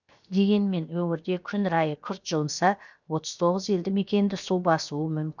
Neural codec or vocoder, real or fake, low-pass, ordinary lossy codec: codec, 16 kHz, 0.7 kbps, FocalCodec; fake; 7.2 kHz; none